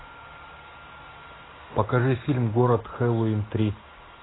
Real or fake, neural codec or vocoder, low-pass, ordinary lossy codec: real; none; 7.2 kHz; AAC, 16 kbps